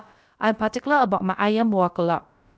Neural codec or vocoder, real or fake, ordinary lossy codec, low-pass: codec, 16 kHz, about 1 kbps, DyCAST, with the encoder's durations; fake; none; none